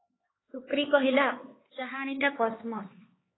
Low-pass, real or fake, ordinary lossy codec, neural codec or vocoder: 7.2 kHz; fake; AAC, 16 kbps; codec, 16 kHz, 4 kbps, X-Codec, HuBERT features, trained on LibriSpeech